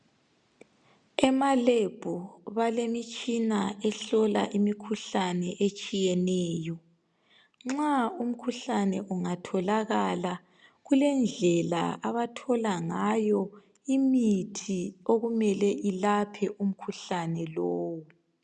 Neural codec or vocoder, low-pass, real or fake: none; 9.9 kHz; real